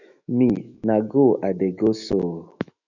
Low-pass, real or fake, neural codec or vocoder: 7.2 kHz; fake; autoencoder, 48 kHz, 128 numbers a frame, DAC-VAE, trained on Japanese speech